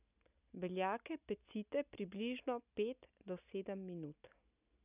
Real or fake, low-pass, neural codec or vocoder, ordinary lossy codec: real; 3.6 kHz; none; none